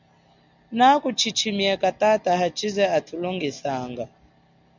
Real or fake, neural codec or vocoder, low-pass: real; none; 7.2 kHz